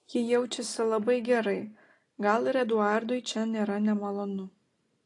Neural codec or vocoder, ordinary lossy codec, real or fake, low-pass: none; AAC, 48 kbps; real; 10.8 kHz